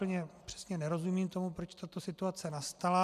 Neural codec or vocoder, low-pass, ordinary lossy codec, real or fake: none; 14.4 kHz; AAC, 96 kbps; real